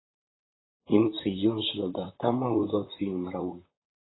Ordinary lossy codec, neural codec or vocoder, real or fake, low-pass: AAC, 16 kbps; vocoder, 44.1 kHz, 128 mel bands, Pupu-Vocoder; fake; 7.2 kHz